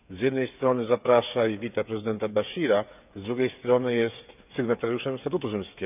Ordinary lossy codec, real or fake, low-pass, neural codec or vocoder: none; fake; 3.6 kHz; codec, 16 kHz, 8 kbps, FreqCodec, smaller model